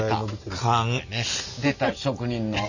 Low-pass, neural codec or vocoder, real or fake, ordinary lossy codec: 7.2 kHz; none; real; none